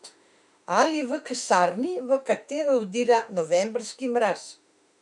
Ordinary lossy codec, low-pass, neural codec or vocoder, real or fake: none; 10.8 kHz; autoencoder, 48 kHz, 32 numbers a frame, DAC-VAE, trained on Japanese speech; fake